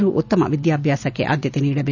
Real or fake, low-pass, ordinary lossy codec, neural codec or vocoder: real; 7.2 kHz; none; none